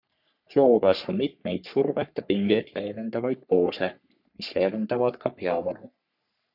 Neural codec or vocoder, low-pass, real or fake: codec, 44.1 kHz, 3.4 kbps, Pupu-Codec; 5.4 kHz; fake